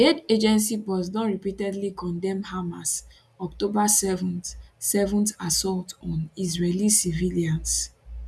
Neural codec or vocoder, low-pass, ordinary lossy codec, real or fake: none; none; none; real